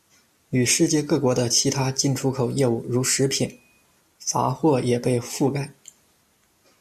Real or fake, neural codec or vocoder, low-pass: real; none; 14.4 kHz